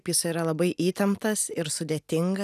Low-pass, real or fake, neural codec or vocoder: 14.4 kHz; real; none